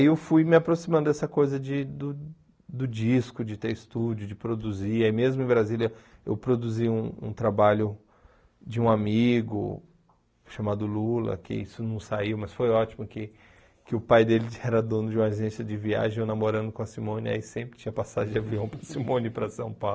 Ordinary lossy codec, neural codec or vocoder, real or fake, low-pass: none; none; real; none